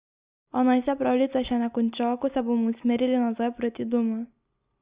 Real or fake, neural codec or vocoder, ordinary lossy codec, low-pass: real; none; Opus, 64 kbps; 3.6 kHz